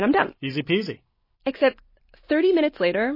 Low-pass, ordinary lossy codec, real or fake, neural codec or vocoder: 5.4 kHz; MP3, 24 kbps; real; none